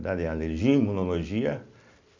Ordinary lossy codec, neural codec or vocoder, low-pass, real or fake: MP3, 64 kbps; none; 7.2 kHz; real